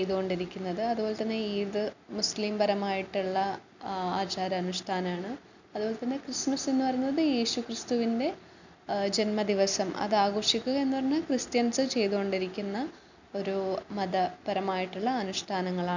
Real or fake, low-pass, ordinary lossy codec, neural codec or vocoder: real; 7.2 kHz; none; none